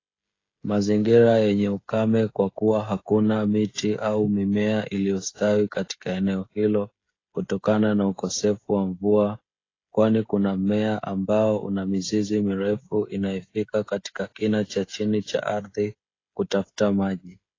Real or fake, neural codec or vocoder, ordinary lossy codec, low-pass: fake; codec, 16 kHz, 16 kbps, FreqCodec, smaller model; AAC, 32 kbps; 7.2 kHz